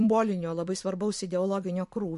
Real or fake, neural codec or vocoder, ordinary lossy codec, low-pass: fake; vocoder, 44.1 kHz, 128 mel bands every 256 samples, BigVGAN v2; MP3, 48 kbps; 14.4 kHz